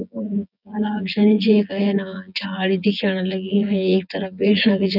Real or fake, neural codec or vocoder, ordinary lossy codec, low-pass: fake; vocoder, 24 kHz, 100 mel bands, Vocos; none; 5.4 kHz